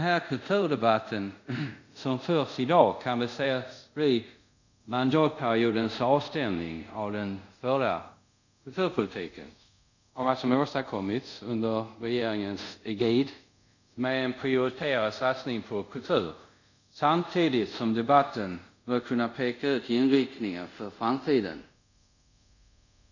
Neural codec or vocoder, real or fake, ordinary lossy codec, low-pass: codec, 24 kHz, 0.5 kbps, DualCodec; fake; none; 7.2 kHz